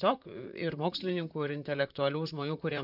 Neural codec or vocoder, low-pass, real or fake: vocoder, 44.1 kHz, 128 mel bands, Pupu-Vocoder; 5.4 kHz; fake